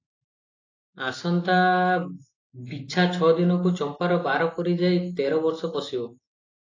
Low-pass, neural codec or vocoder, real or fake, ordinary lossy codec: 7.2 kHz; none; real; AAC, 32 kbps